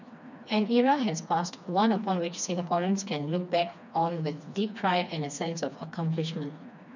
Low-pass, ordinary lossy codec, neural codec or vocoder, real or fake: 7.2 kHz; none; codec, 16 kHz, 2 kbps, FreqCodec, smaller model; fake